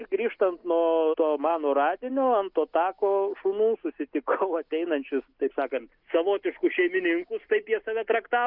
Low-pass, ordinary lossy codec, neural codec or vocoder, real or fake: 5.4 kHz; Opus, 64 kbps; none; real